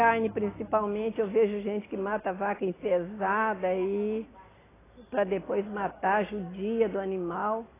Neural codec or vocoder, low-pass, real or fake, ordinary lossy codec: none; 3.6 kHz; real; AAC, 16 kbps